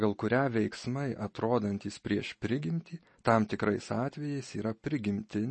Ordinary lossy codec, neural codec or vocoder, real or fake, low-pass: MP3, 32 kbps; none; real; 10.8 kHz